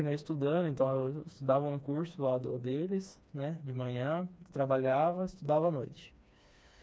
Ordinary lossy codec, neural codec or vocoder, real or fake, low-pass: none; codec, 16 kHz, 2 kbps, FreqCodec, smaller model; fake; none